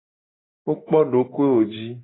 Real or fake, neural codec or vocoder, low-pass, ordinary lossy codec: real; none; 7.2 kHz; AAC, 16 kbps